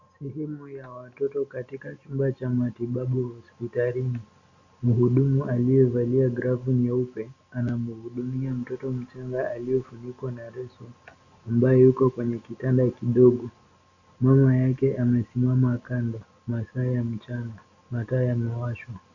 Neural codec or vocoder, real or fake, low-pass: none; real; 7.2 kHz